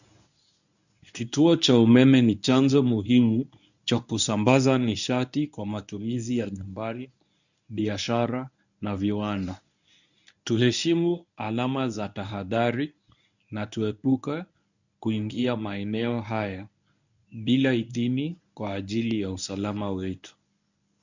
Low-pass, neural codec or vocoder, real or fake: 7.2 kHz; codec, 24 kHz, 0.9 kbps, WavTokenizer, medium speech release version 1; fake